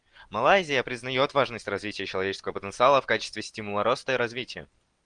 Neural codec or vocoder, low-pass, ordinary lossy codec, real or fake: none; 9.9 kHz; Opus, 24 kbps; real